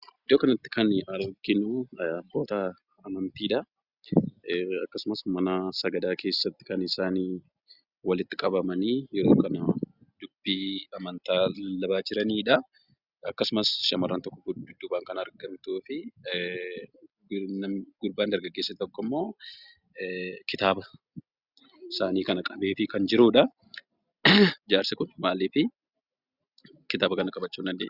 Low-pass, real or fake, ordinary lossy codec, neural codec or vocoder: 5.4 kHz; real; Opus, 64 kbps; none